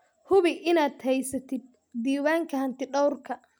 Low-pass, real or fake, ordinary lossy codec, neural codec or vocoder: none; real; none; none